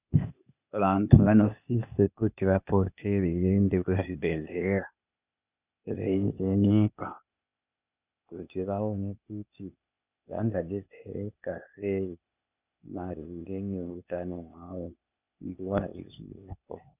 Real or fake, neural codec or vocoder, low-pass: fake; codec, 16 kHz, 0.8 kbps, ZipCodec; 3.6 kHz